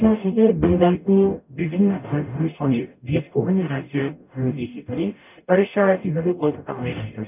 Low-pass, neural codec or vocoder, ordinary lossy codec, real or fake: 3.6 kHz; codec, 44.1 kHz, 0.9 kbps, DAC; none; fake